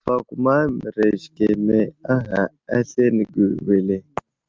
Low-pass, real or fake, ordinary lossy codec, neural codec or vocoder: 7.2 kHz; real; Opus, 24 kbps; none